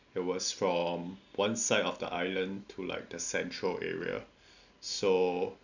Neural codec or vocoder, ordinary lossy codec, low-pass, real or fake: none; none; 7.2 kHz; real